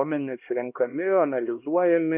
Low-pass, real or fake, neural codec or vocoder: 3.6 kHz; fake; codec, 16 kHz, 1 kbps, X-Codec, HuBERT features, trained on LibriSpeech